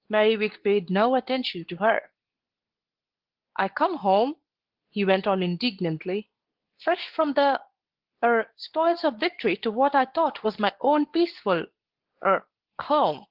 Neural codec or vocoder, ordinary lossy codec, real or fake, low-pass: codec, 24 kHz, 0.9 kbps, WavTokenizer, medium speech release version 2; Opus, 16 kbps; fake; 5.4 kHz